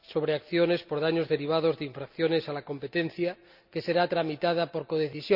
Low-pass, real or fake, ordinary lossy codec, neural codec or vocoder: 5.4 kHz; real; none; none